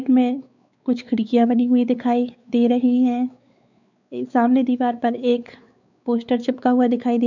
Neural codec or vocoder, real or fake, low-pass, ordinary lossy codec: codec, 16 kHz, 4 kbps, X-Codec, WavLM features, trained on Multilingual LibriSpeech; fake; 7.2 kHz; none